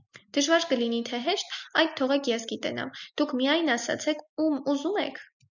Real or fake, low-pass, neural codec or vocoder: real; 7.2 kHz; none